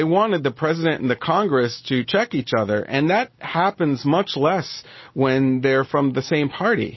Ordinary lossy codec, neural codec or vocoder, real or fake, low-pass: MP3, 24 kbps; none; real; 7.2 kHz